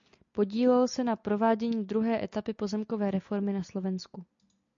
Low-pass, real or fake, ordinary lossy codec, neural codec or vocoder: 7.2 kHz; real; MP3, 64 kbps; none